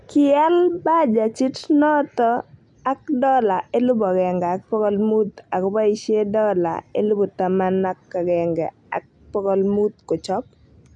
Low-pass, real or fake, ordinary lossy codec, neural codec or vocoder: 10.8 kHz; real; none; none